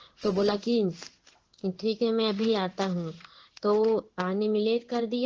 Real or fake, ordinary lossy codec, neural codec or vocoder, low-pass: fake; Opus, 16 kbps; codec, 16 kHz in and 24 kHz out, 1 kbps, XY-Tokenizer; 7.2 kHz